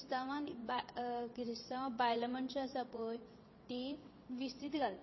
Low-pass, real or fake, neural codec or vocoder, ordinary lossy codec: 7.2 kHz; fake; vocoder, 22.05 kHz, 80 mel bands, WaveNeXt; MP3, 24 kbps